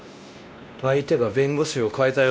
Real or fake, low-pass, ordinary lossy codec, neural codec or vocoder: fake; none; none; codec, 16 kHz, 1 kbps, X-Codec, WavLM features, trained on Multilingual LibriSpeech